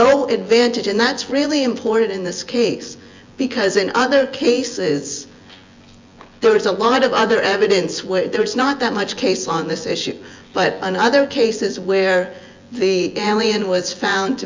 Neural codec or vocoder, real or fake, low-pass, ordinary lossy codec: vocoder, 24 kHz, 100 mel bands, Vocos; fake; 7.2 kHz; MP3, 64 kbps